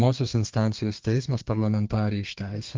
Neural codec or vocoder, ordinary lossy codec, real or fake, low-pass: codec, 32 kHz, 1.9 kbps, SNAC; Opus, 32 kbps; fake; 7.2 kHz